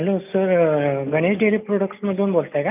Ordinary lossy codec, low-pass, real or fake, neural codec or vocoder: none; 3.6 kHz; real; none